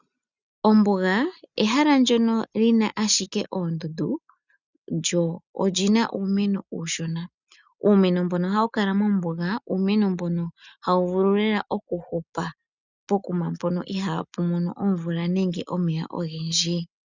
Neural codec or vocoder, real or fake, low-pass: none; real; 7.2 kHz